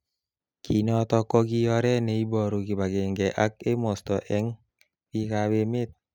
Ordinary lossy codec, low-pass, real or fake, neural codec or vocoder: none; 19.8 kHz; real; none